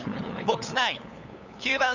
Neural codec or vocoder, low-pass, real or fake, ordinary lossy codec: codec, 16 kHz, 8 kbps, FunCodec, trained on LibriTTS, 25 frames a second; 7.2 kHz; fake; none